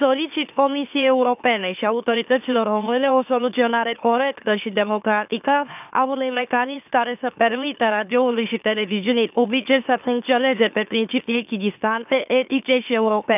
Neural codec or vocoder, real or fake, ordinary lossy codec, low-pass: autoencoder, 44.1 kHz, a latent of 192 numbers a frame, MeloTTS; fake; none; 3.6 kHz